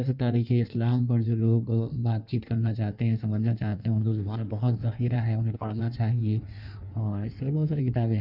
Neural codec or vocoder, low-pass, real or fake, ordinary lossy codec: codec, 16 kHz in and 24 kHz out, 1.1 kbps, FireRedTTS-2 codec; 5.4 kHz; fake; none